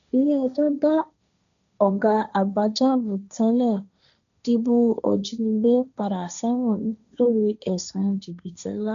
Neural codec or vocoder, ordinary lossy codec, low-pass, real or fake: codec, 16 kHz, 1.1 kbps, Voila-Tokenizer; none; 7.2 kHz; fake